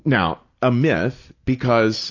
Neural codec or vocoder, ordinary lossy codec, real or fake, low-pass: none; AAC, 48 kbps; real; 7.2 kHz